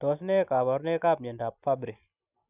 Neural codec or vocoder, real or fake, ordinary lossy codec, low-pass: none; real; none; 3.6 kHz